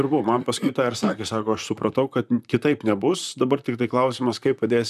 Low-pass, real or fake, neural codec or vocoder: 14.4 kHz; fake; vocoder, 44.1 kHz, 128 mel bands, Pupu-Vocoder